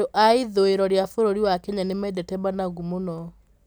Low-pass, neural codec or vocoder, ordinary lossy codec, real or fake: none; none; none; real